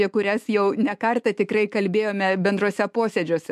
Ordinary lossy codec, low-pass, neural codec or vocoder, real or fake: MP3, 64 kbps; 14.4 kHz; autoencoder, 48 kHz, 128 numbers a frame, DAC-VAE, trained on Japanese speech; fake